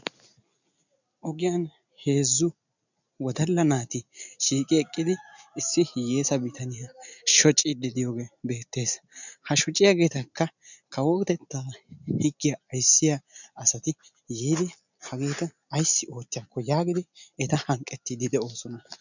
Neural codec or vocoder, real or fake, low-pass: none; real; 7.2 kHz